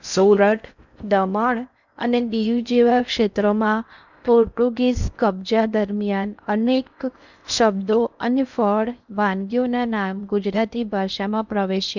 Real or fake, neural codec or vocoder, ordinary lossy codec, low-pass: fake; codec, 16 kHz in and 24 kHz out, 0.6 kbps, FocalCodec, streaming, 4096 codes; none; 7.2 kHz